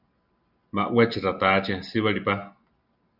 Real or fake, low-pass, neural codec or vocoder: real; 5.4 kHz; none